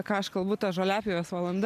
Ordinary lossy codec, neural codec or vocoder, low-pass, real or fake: MP3, 96 kbps; none; 14.4 kHz; real